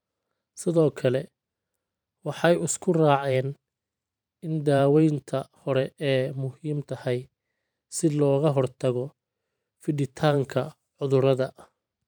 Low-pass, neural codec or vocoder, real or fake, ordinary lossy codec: none; vocoder, 44.1 kHz, 128 mel bands every 512 samples, BigVGAN v2; fake; none